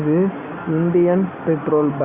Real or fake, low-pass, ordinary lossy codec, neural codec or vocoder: real; 3.6 kHz; Opus, 64 kbps; none